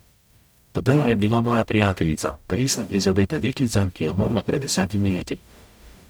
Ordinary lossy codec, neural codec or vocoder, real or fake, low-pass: none; codec, 44.1 kHz, 0.9 kbps, DAC; fake; none